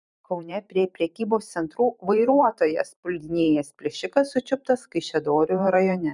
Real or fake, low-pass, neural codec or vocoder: fake; 10.8 kHz; vocoder, 44.1 kHz, 128 mel bands every 512 samples, BigVGAN v2